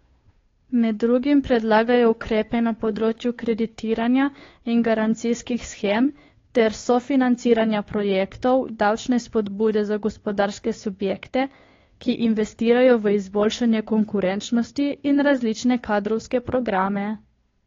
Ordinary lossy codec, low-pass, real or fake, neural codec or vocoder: AAC, 32 kbps; 7.2 kHz; fake; codec, 16 kHz, 2 kbps, FunCodec, trained on Chinese and English, 25 frames a second